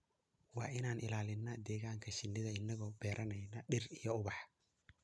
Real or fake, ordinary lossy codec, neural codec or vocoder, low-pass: real; none; none; none